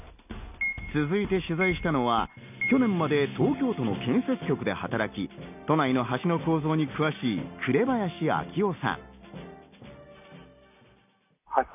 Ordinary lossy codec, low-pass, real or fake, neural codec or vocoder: none; 3.6 kHz; real; none